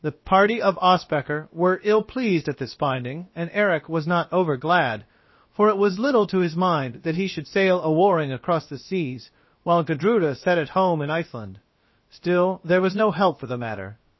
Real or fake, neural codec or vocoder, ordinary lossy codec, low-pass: fake; codec, 16 kHz, about 1 kbps, DyCAST, with the encoder's durations; MP3, 24 kbps; 7.2 kHz